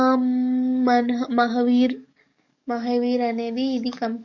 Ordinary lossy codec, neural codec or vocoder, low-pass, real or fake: none; codec, 44.1 kHz, 7.8 kbps, DAC; 7.2 kHz; fake